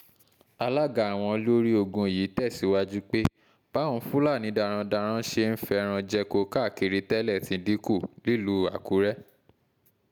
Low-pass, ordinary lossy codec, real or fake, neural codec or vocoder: 19.8 kHz; none; real; none